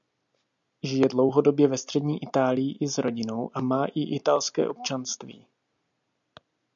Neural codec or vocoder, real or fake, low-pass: none; real; 7.2 kHz